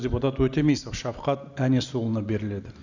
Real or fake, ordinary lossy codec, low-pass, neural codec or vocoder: real; none; 7.2 kHz; none